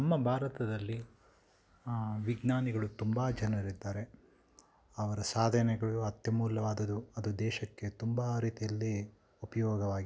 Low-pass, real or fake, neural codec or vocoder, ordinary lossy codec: none; real; none; none